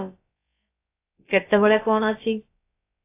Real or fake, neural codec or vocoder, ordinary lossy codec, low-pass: fake; codec, 16 kHz, about 1 kbps, DyCAST, with the encoder's durations; AAC, 24 kbps; 3.6 kHz